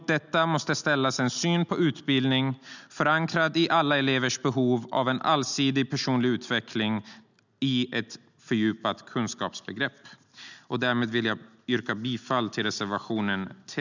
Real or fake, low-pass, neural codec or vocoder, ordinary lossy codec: real; 7.2 kHz; none; none